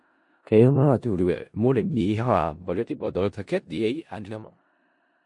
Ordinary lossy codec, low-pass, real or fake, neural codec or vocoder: MP3, 48 kbps; 10.8 kHz; fake; codec, 16 kHz in and 24 kHz out, 0.4 kbps, LongCat-Audio-Codec, four codebook decoder